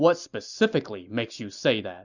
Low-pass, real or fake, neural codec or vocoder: 7.2 kHz; real; none